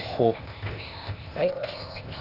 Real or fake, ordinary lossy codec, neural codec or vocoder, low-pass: fake; none; codec, 16 kHz, 0.8 kbps, ZipCodec; 5.4 kHz